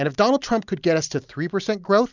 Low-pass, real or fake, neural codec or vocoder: 7.2 kHz; real; none